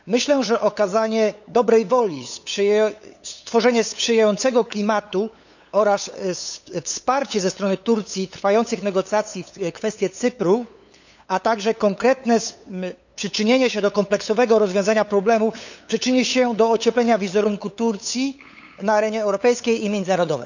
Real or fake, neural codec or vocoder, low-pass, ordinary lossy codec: fake; codec, 16 kHz, 8 kbps, FunCodec, trained on LibriTTS, 25 frames a second; 7.2 kHz; none